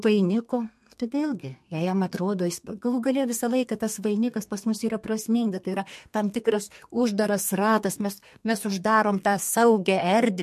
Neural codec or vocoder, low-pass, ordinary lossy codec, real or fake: codec, 32 kHz, 1.9 kbps, SNAC; 14.4 kHz; MP3, 64 kbps; fake